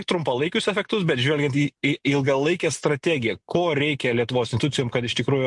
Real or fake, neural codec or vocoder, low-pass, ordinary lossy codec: real; none; 10.8 kHz; MP3, 64 kbps